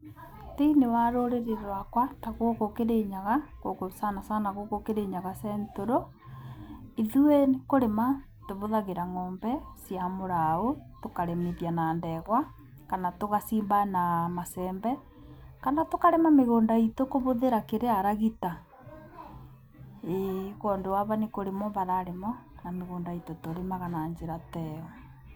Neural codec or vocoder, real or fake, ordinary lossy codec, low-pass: none; real; none; none